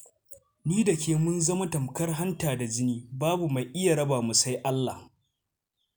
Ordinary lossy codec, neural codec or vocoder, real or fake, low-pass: none; none; real; none